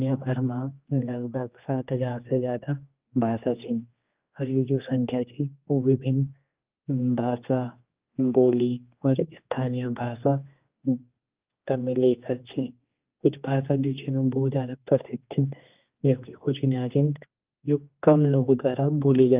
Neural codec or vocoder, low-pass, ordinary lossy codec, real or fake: codec, 16 kHz, 2 kbps, X-Codec, HuBERT features, trained on general audio; 3.6 kHz; Opus, 24 kbps; fake